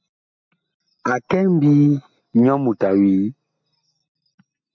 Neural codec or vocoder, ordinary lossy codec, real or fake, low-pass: none; MP3, 64 kbps; real; 7.2 kHz